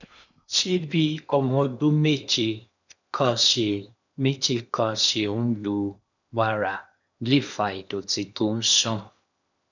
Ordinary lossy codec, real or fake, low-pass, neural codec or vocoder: none; fake; 7.2 kHz; codec, 16 kHz in and 24 kHz out, 0.8 kbps, FocalCodec, streaming, 65536 codes